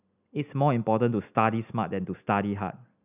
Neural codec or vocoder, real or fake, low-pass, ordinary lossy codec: none; real; 3.6 kHz; none